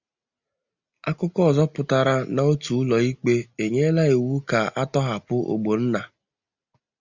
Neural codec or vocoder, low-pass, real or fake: none; 7.2 kHz; real